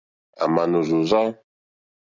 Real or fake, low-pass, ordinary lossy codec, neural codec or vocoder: real; 7.2 kHz; Opus, 64 kbps; none